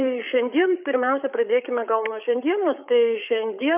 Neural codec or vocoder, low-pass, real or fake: codec, 16 kHz, 8 kbps, FreqCodec, larger model; 3.6 kHz; fake